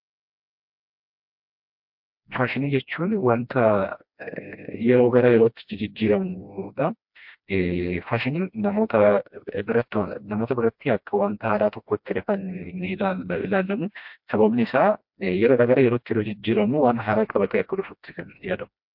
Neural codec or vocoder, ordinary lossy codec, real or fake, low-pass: codec, 16 kHz, 1 kbps, FreqCodec, smaller model; MP3, 48 kbps; fake; 5.4 kHz